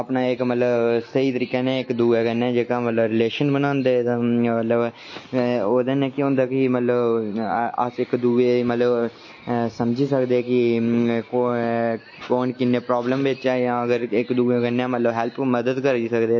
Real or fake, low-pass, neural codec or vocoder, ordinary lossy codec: real; 7.2 kHz; none; MP3, 32 kbps